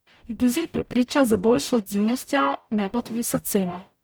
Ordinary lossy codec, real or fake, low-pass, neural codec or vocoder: none; fake; none; codec, 44.1 kHz, 0.9 kbps, DAC